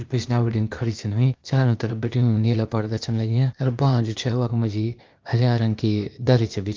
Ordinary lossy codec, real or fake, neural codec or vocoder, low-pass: Opus, 24 kbps; fake; codec, 16 kHz, 0.8 kbps, ZipCodec; 7.2 kHz